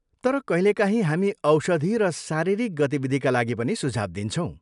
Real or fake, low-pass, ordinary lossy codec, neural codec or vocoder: real; 10.8 kHz; none; none